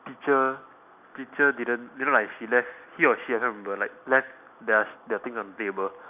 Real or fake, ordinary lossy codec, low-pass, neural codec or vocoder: real; none; 3.6 kHz; none